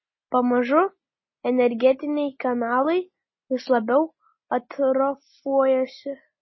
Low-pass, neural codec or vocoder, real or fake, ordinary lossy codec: 7.2 kHz; none; real; MP3, 24 kbps